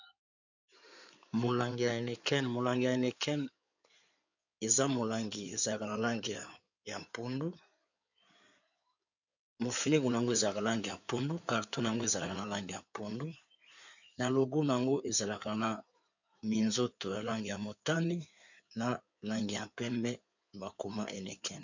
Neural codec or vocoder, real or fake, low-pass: codec, 16 kHz in and 24 kHz out, 2.2 kbps, FireRedTTS-2 codec; fake; 7.2 kHz